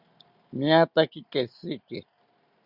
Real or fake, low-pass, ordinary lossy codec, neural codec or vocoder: real; 5.4 kHz; Opus, 64 kbps; none